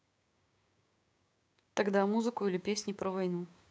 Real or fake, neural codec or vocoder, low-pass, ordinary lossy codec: fake; codec, 16 kHz, 6 kbps, DAC; none; none